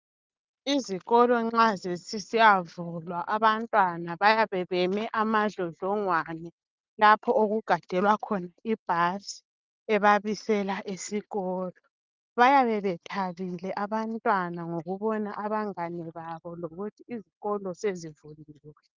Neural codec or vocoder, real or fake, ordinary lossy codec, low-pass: none; real; Opus, 24 kbps; 7.2 kHz